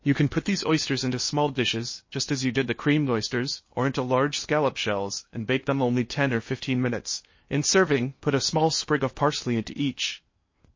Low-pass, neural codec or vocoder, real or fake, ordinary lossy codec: 7.2 kHz; codec, 16 kHz in and 24 kHz out, 0.8 kbps, FocalCodec, streaming, 65536 codes; fake; MP3, 32 kbps